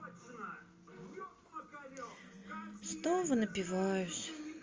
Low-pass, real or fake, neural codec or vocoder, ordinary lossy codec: 7.2 kHz; real; none; Opus, 32 kbps